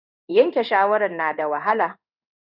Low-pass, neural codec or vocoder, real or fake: 5.4 kHz; codec, 16 kHz in and 24 kHz out, 1 kbps, XY-Tokenizer; fake